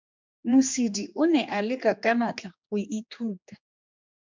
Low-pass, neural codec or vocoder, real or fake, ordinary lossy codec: 7.2 kHz; codec, 16 kHz, 2 kbps, X-Codec, HuBERT features, trained on general audio; fake; MP3, 64 kbps